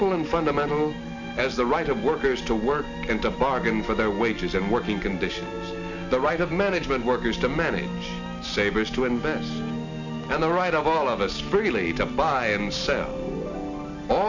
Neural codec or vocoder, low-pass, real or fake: none; 7.2 kHz; real